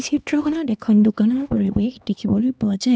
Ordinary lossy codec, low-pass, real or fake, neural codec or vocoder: none; none; fake; codec, 16 kHz, 2 kbps, X-Codec, HuBERT features, trained on LibriSpeech